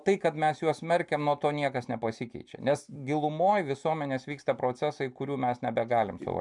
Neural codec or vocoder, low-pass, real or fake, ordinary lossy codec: none; 10.8 kHz; real; MP3, 96 kbps